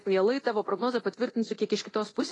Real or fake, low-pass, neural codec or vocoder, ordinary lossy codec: fake; 10.8 kHz; codec, 24 kHz, 0.9 kbps, DualCodec; AAC, 32 kbps